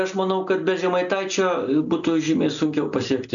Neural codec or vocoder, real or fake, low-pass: none; real; 7.2 kHz